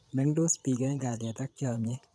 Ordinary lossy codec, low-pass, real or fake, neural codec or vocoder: none; none; fake; vocoder, 22.05 kHz, 80 mel bands, Vocos